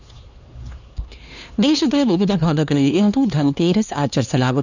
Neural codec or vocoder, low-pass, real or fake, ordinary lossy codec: codec, 16 kHz, 2 kbps, X-Codec, HuBERT features, trained on LibriSpeech; 7.2 kHz; fake; none